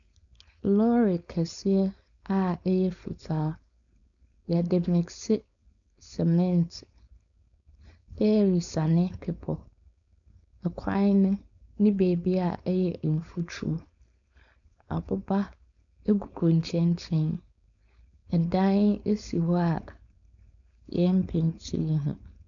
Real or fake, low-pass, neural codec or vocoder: fake; 7.2 kHz; codec, 16 kHz, 4.8 kbps, FACodec